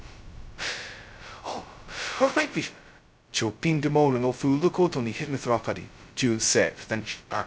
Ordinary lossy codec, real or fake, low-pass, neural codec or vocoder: none; fake; none; codec, 16 kHz, 0.2 kbps, FocalCodec